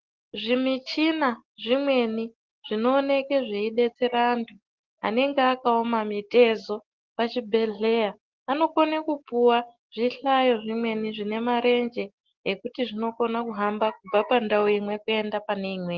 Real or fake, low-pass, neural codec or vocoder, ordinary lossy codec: real; 7.2 kHz; none; Opus, 16 kbps